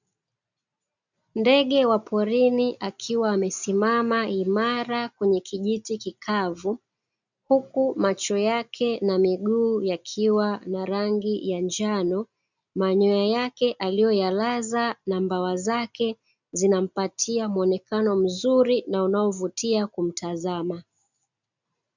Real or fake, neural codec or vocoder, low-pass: real; none; 7.2 kHz